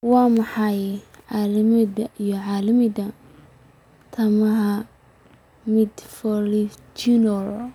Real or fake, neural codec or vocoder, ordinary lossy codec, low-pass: real; none; none; 19.8 kHz